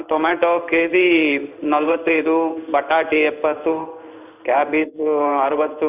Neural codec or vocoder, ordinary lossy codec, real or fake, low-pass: codec, 16 kHz in and 24 kHz out, 1 kbps, XY-Tokenizer; none; fake; 3.6 kHz